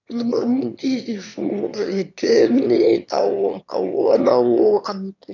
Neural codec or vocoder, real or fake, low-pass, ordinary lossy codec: autoencoder, 22.05 kHz, a latent of 192 numbers a frame, VITS, trained on one speaker; fake; 7.2 kHz; none